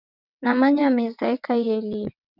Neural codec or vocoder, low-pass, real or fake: vocoder, 22.05 kHz, 80 mel bands, WaveNeXt; 5.4 kHz; fake